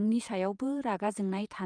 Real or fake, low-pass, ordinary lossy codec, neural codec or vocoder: fake; 9.9 kHz; Opus, 32 kbps; vocoder, 48 kHz, 128 mel bands, Vocos